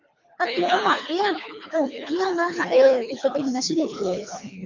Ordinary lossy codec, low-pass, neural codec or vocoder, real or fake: MP3, 64 kbps; 7.2 kHz; codec, 24 kHz, 3 kbps, HILCodec; fake